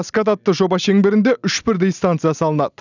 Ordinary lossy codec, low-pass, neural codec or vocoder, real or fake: none; 7.2 kHz; none; real